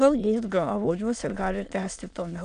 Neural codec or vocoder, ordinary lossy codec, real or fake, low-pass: autoencoder, 22.05 kHz, a latent of 192 numbers a frame, VITS, trained on many speakers; AAC, 96 kbps; fake; 9.9 kHz